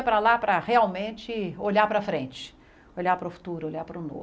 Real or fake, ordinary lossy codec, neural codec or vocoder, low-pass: real; none; none; none